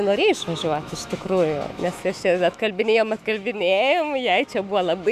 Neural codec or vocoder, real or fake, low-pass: autoencoder, 48 kHz, 128 numbers a frame, DAC-VAE, trained on Japanese speech; fake; 14.4 kHz